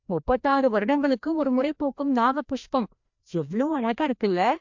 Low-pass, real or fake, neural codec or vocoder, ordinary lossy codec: 7.2 kHz; fake; codec, 16 kHz, 1 kbps, FreqCodec, larger model; MP3, 64 kbps